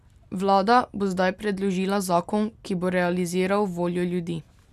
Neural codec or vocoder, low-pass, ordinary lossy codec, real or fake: none; 14.4 kHz; none; real